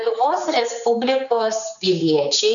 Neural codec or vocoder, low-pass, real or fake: codec, 16 kHz, 4 kbps, X-Codec, HuBERT features, trained on general audio; 7.2 kHz; fake